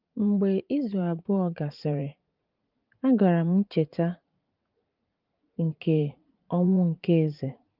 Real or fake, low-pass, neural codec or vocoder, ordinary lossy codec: fake; 5.4 kHz; vocoder, 44.1 kHz, 80 mel bands, Vocos; Opus, 24 kbps